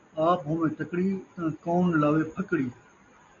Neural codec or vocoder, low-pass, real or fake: none; 7.2 kHz; real